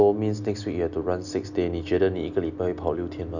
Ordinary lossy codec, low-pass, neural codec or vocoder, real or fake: none; 7.2 kHz; none; real